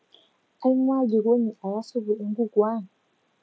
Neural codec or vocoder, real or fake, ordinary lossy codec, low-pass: none; real; none; none